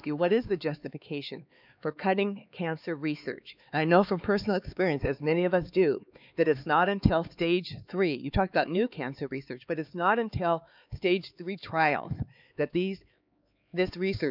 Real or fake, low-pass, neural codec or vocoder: fake; 5.4 kHz; codec, 16 kHz, 4 kbps, X-Codec, HuBERT features, trained on LibriSpeech